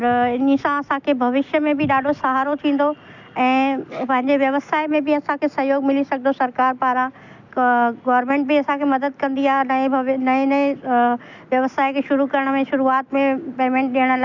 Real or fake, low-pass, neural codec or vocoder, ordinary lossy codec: real; 7.2 kHz; none; none